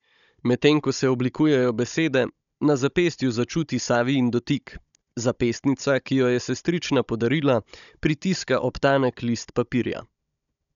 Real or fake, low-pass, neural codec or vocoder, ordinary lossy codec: fake; 7.2 kHz; codec, 16 kHz, 16 kbps, FunCodec, trained on Chinese and English, 50 frames a second; none